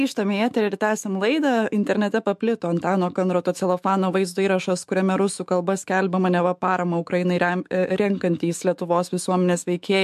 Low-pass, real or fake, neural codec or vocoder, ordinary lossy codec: 14.4 kHz; fake; autoencoder, 48 kHz, 128 numbers a frame, DAC-VAE, trained on Japanese speech; MP3, 64 kbps